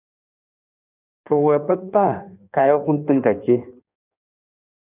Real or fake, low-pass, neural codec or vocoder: fake; 3.6 kHz; codec, 16 kHz in and 24 kHz out, 1.1 kbps, FireRedTTS-2 codec